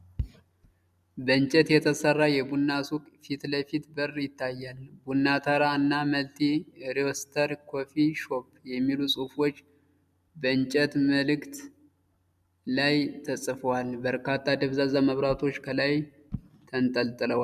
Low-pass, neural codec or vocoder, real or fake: 14.4 kHz; none; real